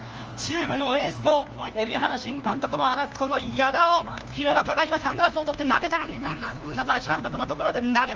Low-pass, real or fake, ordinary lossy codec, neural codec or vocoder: 7.2 kHz; fake; Opus, 24 kbps; codec, 16 kHz, 1 kbps, FunCodec, trained on LibriTTS, 50 frames a second